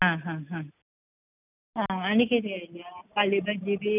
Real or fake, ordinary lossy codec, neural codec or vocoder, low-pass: real; none; none; 3.6 kHz